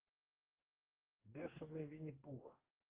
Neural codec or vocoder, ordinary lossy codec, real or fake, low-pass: codec, 44.1 kHz, 2.6 kbps, DAC; Opus, 32 kbps; fake; 3.6 kHz